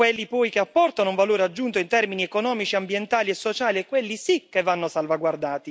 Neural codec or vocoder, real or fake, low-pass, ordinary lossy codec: none; real; none; none